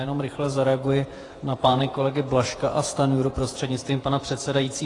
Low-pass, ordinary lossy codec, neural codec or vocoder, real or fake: 10.8 kHz; AAC, 32 kbps; vocoder, 48 kHz, 128 mel bands, Vocos; fake